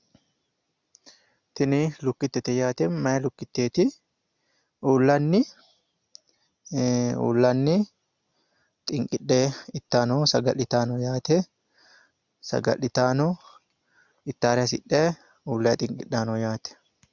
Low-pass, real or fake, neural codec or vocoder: 7.2 kHz; real; none